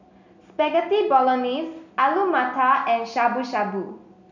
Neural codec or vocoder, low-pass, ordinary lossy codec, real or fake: none; 7.2 kHz; none; real